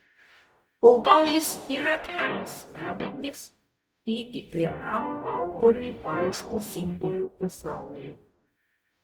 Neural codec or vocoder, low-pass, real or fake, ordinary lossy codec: codec, 44.1 kHz, 0.9 kbps, DAC; 19.8 kHz; fake; Opus, 64 kbps